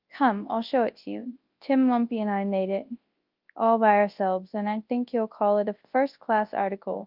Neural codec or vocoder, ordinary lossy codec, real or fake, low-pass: codec, 24 kHz, 0.9 kbps, WavTokenizer, large speech release; Opus, 32 kbps; fake; 5.4 kHz